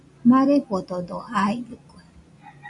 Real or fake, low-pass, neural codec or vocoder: real; 10.8 kHz; none